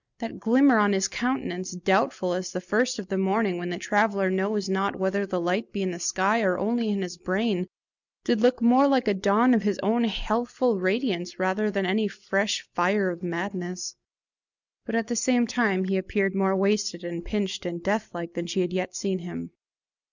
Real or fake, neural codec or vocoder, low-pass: real; none; 7.2 kHz